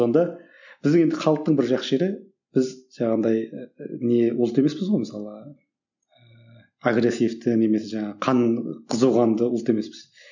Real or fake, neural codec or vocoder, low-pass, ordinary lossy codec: real; none; 7.2 kHz; none